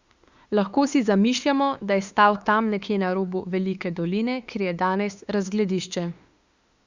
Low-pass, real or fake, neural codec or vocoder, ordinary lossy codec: 7.2 kHz; fake; autoencoder, 48 kHz, 32 numbers a frame, DAC-VAE, trained on Japanese speech; Opus, 64 kbps